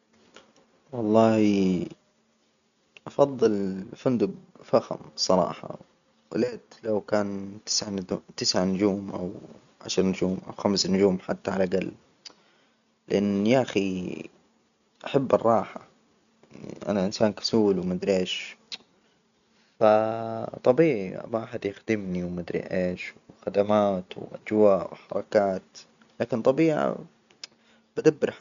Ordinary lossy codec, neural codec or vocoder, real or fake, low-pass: none; none; real; 7.2 kHz